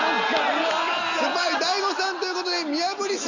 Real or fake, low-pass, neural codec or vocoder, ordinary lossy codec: real; 7.2 kHz; none; none